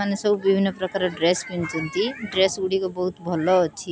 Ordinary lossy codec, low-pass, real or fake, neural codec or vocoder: none; none; real; none